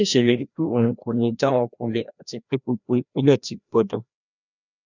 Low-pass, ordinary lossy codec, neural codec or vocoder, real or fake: 7.2 kHz; none; codec, 16 kHz, 1 kbps, FreqCodec, larger model; fake